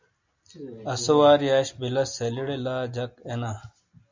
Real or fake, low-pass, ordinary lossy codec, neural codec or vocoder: real; 7.2 kHz; MP3, 64 kbps; none